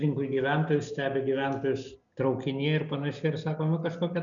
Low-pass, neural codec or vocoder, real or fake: 7.2 kHz; none; real